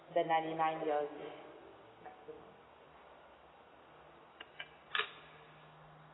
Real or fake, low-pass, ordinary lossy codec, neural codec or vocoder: real; 7.2 kHz; AAC, 16 kbps; none